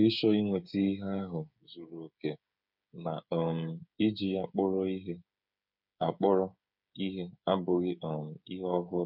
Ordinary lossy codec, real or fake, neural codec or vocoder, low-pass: none; fake; codec, 16 kHz, 8 kbps, FreqCodec, smaller model; 5.4 kHz